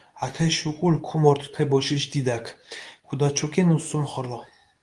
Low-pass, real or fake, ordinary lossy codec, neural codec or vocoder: 10.8 kHz; fake; Opus, 32 kbps; codec, 24 kHz, 0.9 kbps, WavTokenizer, medium speech release version 2